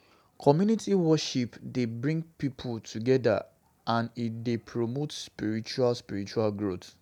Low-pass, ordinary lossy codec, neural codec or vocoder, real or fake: 19.8 kHz; none; none; real